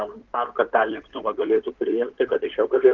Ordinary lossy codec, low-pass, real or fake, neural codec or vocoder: Opus, 16 kbps; 7.2 kHz; fake; codec, 16 kHz in and 24 kHz out, 2.2 kbps, FireRedTTS-2 codec